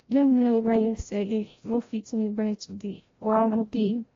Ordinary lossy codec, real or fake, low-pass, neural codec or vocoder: AAC, 32 kbps; fake; 7.2 kHz; codec, 16 kHz, 0.5 kbps, FreqCodec, larger model